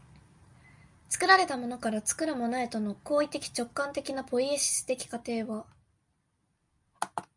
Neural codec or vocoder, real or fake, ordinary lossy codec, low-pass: none; real; MP3, 96 kbps; 10.8 kHz